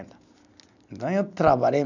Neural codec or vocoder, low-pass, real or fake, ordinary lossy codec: none; 7.2 kHz; real; none